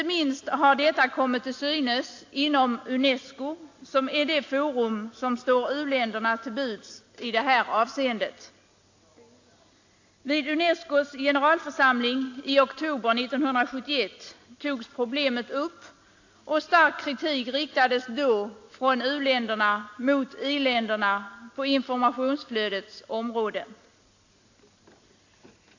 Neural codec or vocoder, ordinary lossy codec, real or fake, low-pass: none; AAC, 48 kbps; real; 7.2 kHz